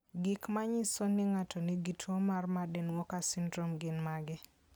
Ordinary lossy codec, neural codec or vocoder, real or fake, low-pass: none; none; real; none